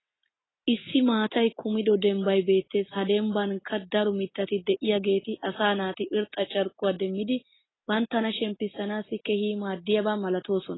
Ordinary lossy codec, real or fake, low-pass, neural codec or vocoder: AAC, 16 kbps; real; 7.2 kHz; none